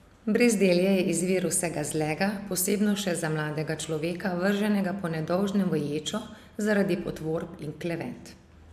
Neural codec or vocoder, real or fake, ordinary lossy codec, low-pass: none; real; AAC, 96 kbps; 14.4 kHz